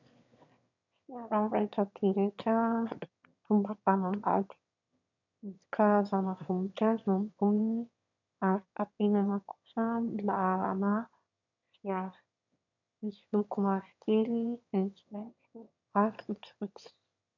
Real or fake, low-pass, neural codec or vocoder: fake; 7.2 kHz; autoencoder, 22.05 kHz, a latent of 192 numbers a frame, VITS, trained on one speaker